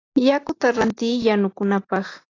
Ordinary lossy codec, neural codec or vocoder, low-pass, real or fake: AAC, 32 kbps; none; 7.2 kHz; real